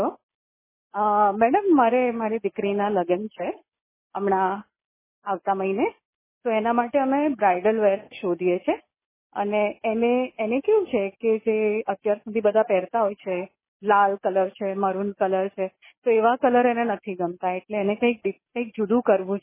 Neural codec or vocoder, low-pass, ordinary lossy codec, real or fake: none; 3.6 kHz; MP3, 16 kbps; real